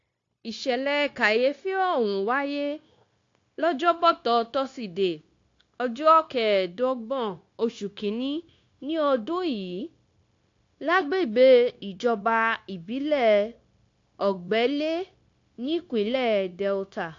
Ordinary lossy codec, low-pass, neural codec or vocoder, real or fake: AAC, 48 kbps; 7.2 kHz; codec, 16 kHz, 0.9 kbps, LongCat-Audio-Codec; fake